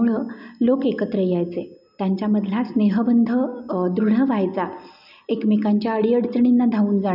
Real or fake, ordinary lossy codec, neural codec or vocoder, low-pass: real; none; none; 5.4 kHz